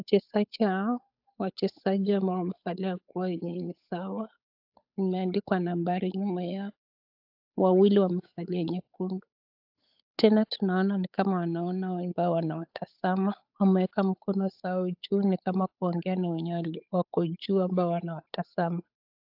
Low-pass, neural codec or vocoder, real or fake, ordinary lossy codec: 5.4 kHz; codec, 16 kHz, 8 kbps, FunCodec, trained on Chinese and English, 25 frames a second; fake; AAC, 48 kbps